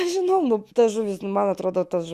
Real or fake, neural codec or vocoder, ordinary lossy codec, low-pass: fake; autoencoder, 48 kHz, 128 numbers a frame, DAC-VAE, trained on Japanese speech; Opus, 64 kbps; 14.4 kHz